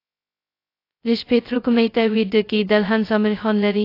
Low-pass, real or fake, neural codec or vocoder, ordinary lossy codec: 5.4 kHz; fake; codec, 16 kHz, 0.2 kbps, FocalCodec; AAC, 32 kbps